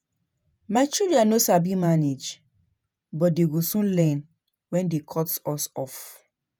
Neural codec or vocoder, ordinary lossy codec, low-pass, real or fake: vocoder, 48 kHz, 128 mel bands, Vocos; none; none; fake